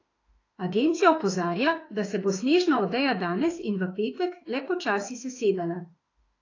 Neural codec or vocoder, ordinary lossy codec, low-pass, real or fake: autoencoder, 48 kHz, 32 numbers a frame, DAC-VAE, trained on Japanese speech; AAC, 32 kbps; 7.2 kHz; fake